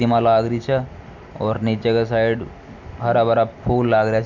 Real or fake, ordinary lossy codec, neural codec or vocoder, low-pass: real; none; none; 7.2 kHz